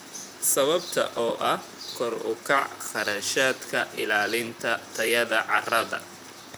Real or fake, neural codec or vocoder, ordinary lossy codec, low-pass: fake; vocoder, 44.1 kHz, 128 mel bands, Pupu-Vocoder; none; none